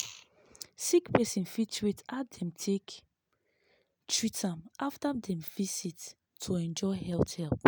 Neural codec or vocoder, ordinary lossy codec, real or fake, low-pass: none; none; real; none